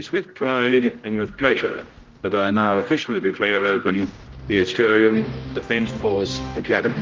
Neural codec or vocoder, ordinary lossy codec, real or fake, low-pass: codec, 16 kHz, 0.5 kbps, X-Codec, HuBERT features, trained on general audio; Opus, 32 kbps; fake; 7.2 kHz